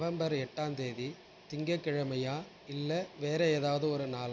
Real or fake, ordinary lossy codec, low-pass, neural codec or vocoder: real; none; none; none